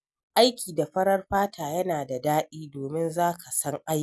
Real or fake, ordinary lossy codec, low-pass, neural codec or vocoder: real; none; none; none